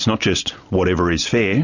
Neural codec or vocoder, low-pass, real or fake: none; 7.2 kHz; real